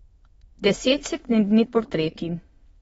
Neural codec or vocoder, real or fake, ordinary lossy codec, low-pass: autoencoder, 22.05 kHz, a latent of 192 numbers a frame, VITS, trained on many speakers; fake; AAC, 24 kbps; 9.9 kHz